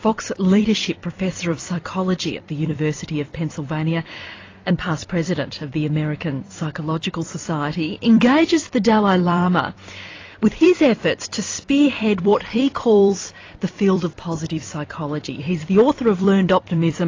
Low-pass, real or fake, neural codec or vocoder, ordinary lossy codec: 7.2 kHz; fake; vocoder, 44.1 kHz, 128 mel bands every 256 samples, BigVGAN v2; AAC, 32 kbps